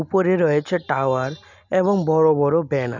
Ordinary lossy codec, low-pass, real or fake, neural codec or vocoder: none; 7.2 kHz; real; none